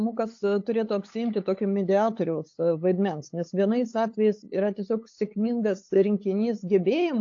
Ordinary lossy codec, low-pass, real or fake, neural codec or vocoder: AAC, 48 kbps; 7.2 kHz; fake; codec, 16 kHz, 8 kbps, FunCodec, trained on LibriTTS, 25 frames a second